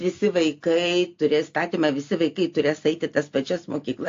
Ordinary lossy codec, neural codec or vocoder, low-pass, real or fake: AAC, 48 kbps; none; 7.2 kHz; real